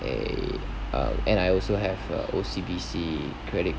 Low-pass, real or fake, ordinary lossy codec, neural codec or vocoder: none; real; none; none